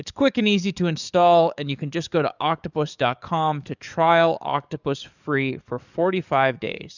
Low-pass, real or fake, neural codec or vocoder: 7.2 kHz; fake; codec, 44.1 kHz, 7.8 kbps, DAC